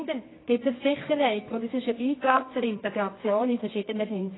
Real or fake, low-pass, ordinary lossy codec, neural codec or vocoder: fake; 7.2 kHz; AAC, 16 kbps; codec, 24 kHz, 0.9 kbps, WavTokenizer, medium music audio release